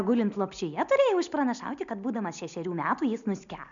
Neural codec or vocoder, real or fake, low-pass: none; real; 7.2 kHz